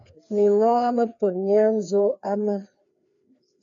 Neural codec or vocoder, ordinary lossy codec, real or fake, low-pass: codec, 16 kHz, 2 kbps, FreqCodec, larger model; AAC, 64 kbps; fake; 7.2 kHz